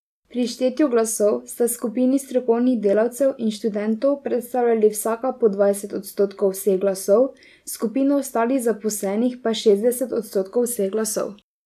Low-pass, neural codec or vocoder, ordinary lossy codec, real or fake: 14.4 kHz; none; none; real